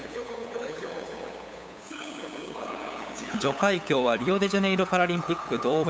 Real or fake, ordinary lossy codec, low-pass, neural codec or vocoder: fake; none; none; codec, 16 kHz, 8 kbps, FunCodec, trained on LibriTTS, 25 frames a second